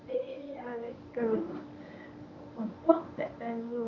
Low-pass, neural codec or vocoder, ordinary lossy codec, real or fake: 7.2 kHz; codec, 24 kHz, 0.9 kbps, WavTokenizer, medium speech release version 1; none; fake